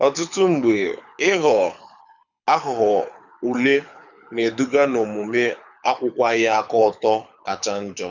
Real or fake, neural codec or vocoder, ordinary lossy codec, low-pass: fake; codec, 24 kHz, 6 kbps, HILCodec; none; 7.2 kHz